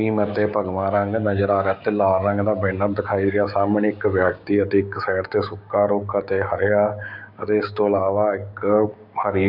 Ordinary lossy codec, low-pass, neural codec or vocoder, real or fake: AAC, 48 kbps; 5.4 kHz; codec, 16 kHz, 6 kbps, DAC; fake